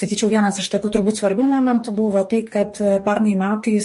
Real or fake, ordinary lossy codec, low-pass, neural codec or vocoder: fake; MP3, 48 kbps; 14.4 kHz; codec, 44.1 kHz, 2.6 kbps, DAC